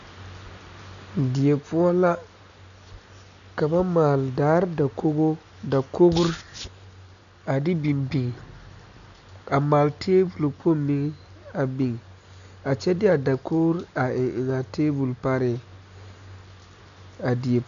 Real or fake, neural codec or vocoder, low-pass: real; none; 7.2 kHz